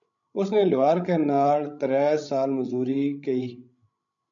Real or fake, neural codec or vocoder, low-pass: fake; codec, 16 kHz, 16 kbps, FreqCodec, larger model; 7.2 kHz